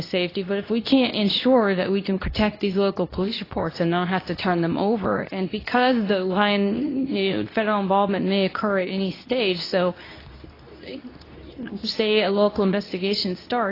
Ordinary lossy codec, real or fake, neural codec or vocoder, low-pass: AAC, 24 kbps; fake; codec, 24 kHz, 0.9 kbps, WavTokenizer, medium speech release version 2; 5.4 kHz